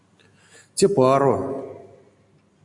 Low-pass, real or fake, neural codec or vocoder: 10.8 kHz; real; none